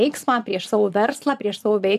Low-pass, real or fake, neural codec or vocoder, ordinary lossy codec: 14.4 kHz; fake; vocoder, 44.1 kHz, 128 mel bands every 512 samples, BigVGAN v2; AAC, 96 kbps